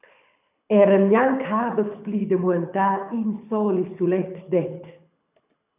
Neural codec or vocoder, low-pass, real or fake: codec, 24 kHz, 6 kbps, HILCodec; 3.6 kHz; fake